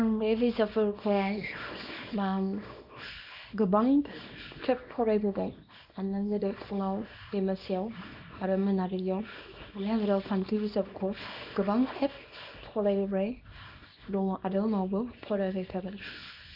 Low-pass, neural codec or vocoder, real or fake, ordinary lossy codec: 5.4 kHz; codec, 24 kHz, 0.9 kbps, WavTokenizer, small release; fake; none